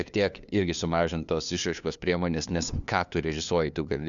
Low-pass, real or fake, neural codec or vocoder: 7.2 kHz; fake; codec, 16 kHz, 2 kbps, FunCodec, trained on LibriTTS, 25 frames a second